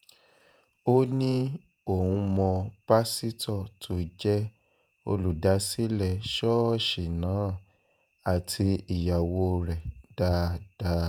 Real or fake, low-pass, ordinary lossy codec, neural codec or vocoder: fake; none; none; vocoder, 48 kHz, 128 mel bands, Vocos